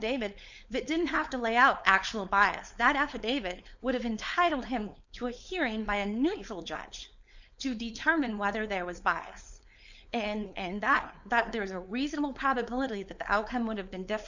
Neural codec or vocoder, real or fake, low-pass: codec, 16 kHz, 4.8 kbps, FACodec; fake; 7.2 kHz